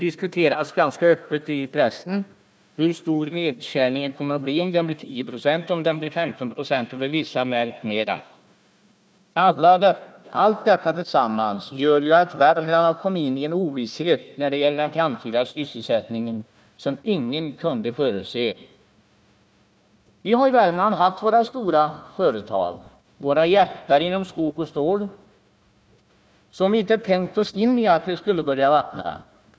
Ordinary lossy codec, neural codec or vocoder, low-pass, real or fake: none; codec, 16 kHz, 1 kbps, FunCodec, trained on Chinese and English, 50 frames a second; none; fake